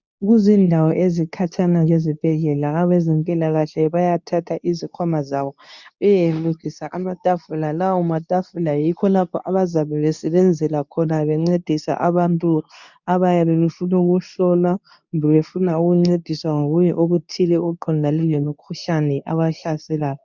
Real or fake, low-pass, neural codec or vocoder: fake; 7.2 kHz; codec, 24 kHz, 0.9 kbps, WavTokenizer, medium speech release version 2